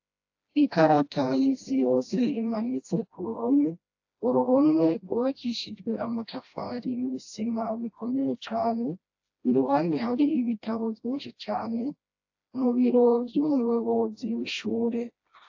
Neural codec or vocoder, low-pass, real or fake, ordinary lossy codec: codec, 16 kHz, 1 kbps, FreqCodec, smaller model; 7.2 kHz; fake; AAC, 48 kbps